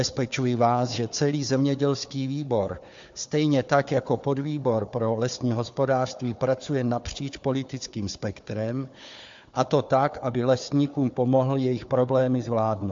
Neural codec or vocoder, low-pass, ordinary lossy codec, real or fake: codec, 16 kHz, 4 kbps, FunCodec, trained on Chinese and English, 50 frames a second; 7.2 kHz; MP3, 48 kbps; fake